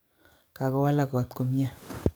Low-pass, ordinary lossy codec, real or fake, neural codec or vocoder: none; none; fake; codec, 44.1 kHz, 7.8 kbps, DAC